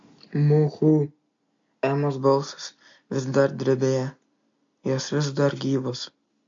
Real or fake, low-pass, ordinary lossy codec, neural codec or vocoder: real; 7.2 kHz; MP3, 48 kbps; none